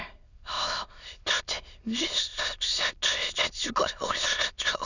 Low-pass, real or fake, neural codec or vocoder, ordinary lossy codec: 7.2 kHz; fake; autoencoder, 22.05 kHz, a latent of 192 numbers a frame, VITS, trained on many speakers; none